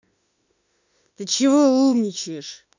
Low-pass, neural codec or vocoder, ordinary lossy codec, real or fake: 7.2 kHz; autoencoder, 48 kHz, 32 numbers a frame, DAC-VAE, trained on Japanese speech; none; fake